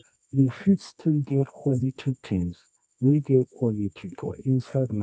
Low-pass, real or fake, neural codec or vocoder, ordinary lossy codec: 9.9 kHz; fake; codec, 24 kHz, 0.9 kbps, WavTokenizer, medium music audio release; none